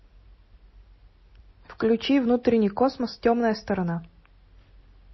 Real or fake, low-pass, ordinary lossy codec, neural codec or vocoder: real; 7.2 kHz; MP3, 24 kbps; none